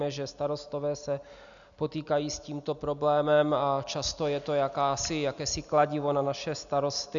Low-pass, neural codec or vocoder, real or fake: 7.2 kHz; none; real